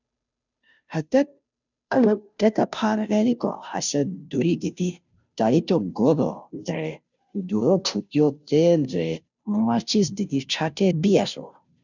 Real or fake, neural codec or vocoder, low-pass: fake; codec, 16 kHz, 0.5 kbps, FunCodec, trained on Chinese and English, 25 frames a second; 7.2 kHz